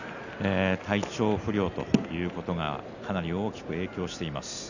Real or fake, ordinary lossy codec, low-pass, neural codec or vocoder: real; none; 7.2 kHz; none